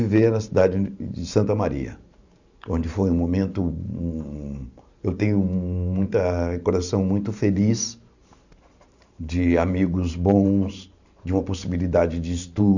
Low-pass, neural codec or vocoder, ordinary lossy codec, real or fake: 7.2 kHz; vocoder, 44.1 kHz, 128 mel bands every 512 samples, BigVGAN v2; none; fake